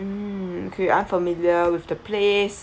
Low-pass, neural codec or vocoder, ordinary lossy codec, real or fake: none; none; none; real